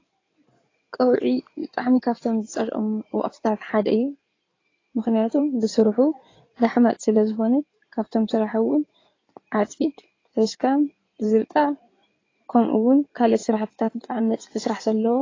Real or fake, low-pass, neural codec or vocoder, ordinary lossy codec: fake; 7.2 kHz; codec, 16 kHz in and 24 kHz out, 2.2 kbps, FireRedTTS-2 codec; AAC, 32 kbps